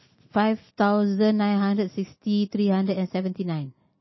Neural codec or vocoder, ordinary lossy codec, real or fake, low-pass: none; MP3, 24 kbps; real; 7.2 kHz